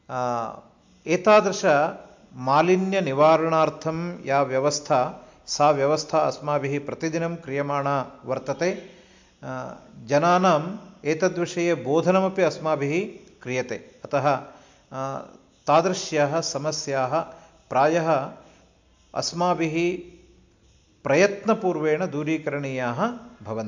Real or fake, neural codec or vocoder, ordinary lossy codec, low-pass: real; none; MP3, 64 kbps; 7.2 kHz